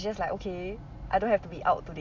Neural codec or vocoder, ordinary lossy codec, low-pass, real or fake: none; AAC, 48 kbps; 7.2 kHz; real